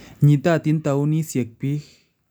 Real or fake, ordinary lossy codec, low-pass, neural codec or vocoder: real; none; none; none